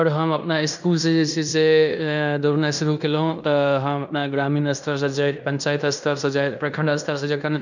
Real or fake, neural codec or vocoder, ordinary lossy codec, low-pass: fake; codec, 16 kHz in and 24 kHz out, 0.9 kbps, LongCat-Audio-Codec, fine tuned four codebook decoder; none; 7.2 kHz